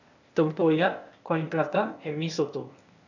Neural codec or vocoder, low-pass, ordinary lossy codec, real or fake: codec, 16 kHz, 0.8 kbps, ZipCodec; 7.2 kHz; none; fake